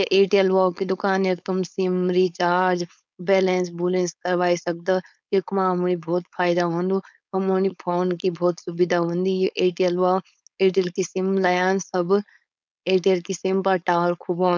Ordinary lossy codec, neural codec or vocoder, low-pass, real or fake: none; codec, 16 kHz, 4.8 kbps, FACodec; none; fake